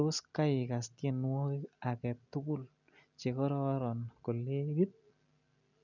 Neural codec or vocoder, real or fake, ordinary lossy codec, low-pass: none; real; none; 7.2 kHz